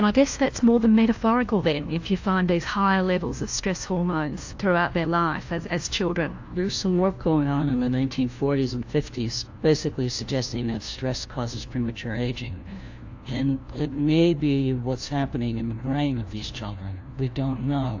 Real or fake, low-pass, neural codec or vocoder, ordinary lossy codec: fake; 7.2 kHz; codec, 16 kHz, 1 kbps, FunCodec, trained on LibriTTS, 50 frames a second; AAC, 48 kbps